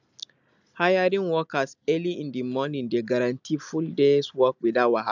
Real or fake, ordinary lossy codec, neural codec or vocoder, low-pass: real; none; none; 7.2 kHz